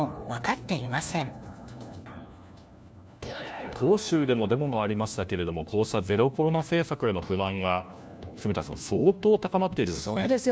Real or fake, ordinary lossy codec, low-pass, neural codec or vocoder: fake; none; none; codec, 16 kHz, 1 kbps, FunCodec, trained on LibriTTS, 50 frames a second